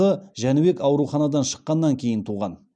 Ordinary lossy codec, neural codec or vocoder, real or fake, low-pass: none; none; real; 9.9 kHz